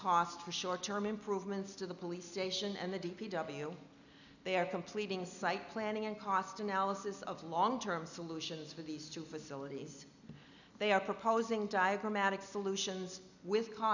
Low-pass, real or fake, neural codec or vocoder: 7.2 kHz; real; none